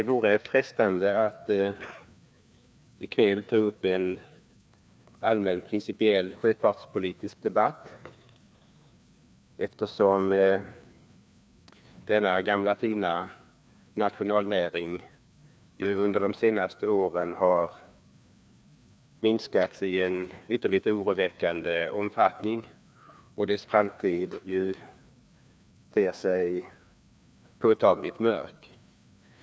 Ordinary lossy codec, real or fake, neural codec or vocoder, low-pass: none; fake; codec, 16 kHz, 2 kbps, FreqCodec, larger model; none